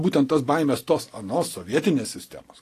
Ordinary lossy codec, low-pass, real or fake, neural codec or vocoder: AAC, 48 kbps; 14.4 kHz; real; none